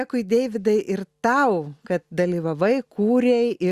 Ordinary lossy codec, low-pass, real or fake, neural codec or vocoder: Opus, 64 kbps; 14.4 kHz; real; none